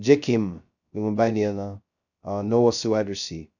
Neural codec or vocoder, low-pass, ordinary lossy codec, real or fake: codec, 16 kHz, 0.2 kbps, FocalCodec; 7.2 kHz; none; fake